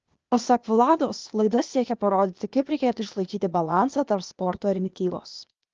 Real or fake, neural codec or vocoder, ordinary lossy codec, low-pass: fake; codec, 16 kHz, 0.8 kbps, ZipCodec; Opus, 24 kbps; 7.2 kHz